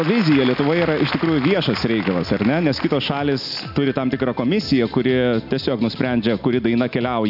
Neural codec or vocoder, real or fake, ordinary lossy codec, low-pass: none; real; AAC, 48 kbps; 5.4 kHz